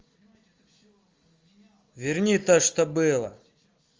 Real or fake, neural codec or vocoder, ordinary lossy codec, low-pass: real; none; Opus, 32 kbps; 7.2 kHz